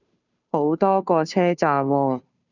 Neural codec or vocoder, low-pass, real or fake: codec, 16 kHz, 2 kbps, FunCodec, trained on Chinese and English, 25 frames a second; 7.2 kHz; fake